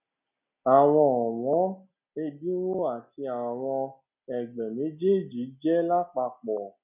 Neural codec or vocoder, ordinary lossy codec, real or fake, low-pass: none; none; real; 3.6 kHz